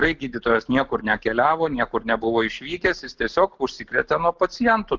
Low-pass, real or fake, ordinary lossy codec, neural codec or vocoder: 7.2 kHz; real; Opus, 16 kbps; none